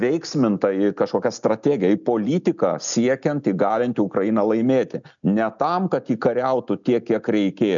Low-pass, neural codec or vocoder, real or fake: 7.2 kHz; none; real